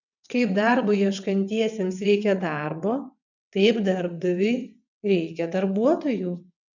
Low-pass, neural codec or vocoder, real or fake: 7.2 kHz; vocoder, 22.05 kHz, 80 mel bands, WaveNeXt; fake